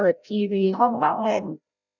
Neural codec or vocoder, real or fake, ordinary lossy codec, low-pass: codec, 16 kHz, 0.5 kbps, FreqCodec, larger model; fake; none; 7.2 kHz